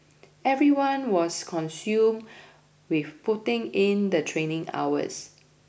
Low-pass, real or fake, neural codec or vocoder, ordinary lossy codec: none; real; none; none